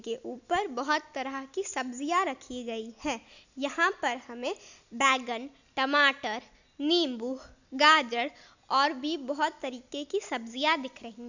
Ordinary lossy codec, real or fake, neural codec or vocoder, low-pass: none; real; none; 7.2 kHz